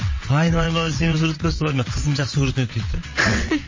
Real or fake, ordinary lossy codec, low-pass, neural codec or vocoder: fake; MP3, 32 kbps; 7.2 kHz; vocoder, 22.05 kHz, 80 mel bands, WaveNeXt